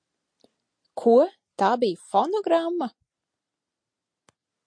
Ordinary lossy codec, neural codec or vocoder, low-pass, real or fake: MP3, 48 kbps; none; 9.9 kHz; real